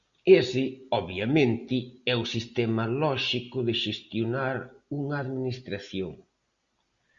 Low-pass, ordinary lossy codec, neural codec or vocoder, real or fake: 7.2 kHz; Opus, 64 kbps; none; real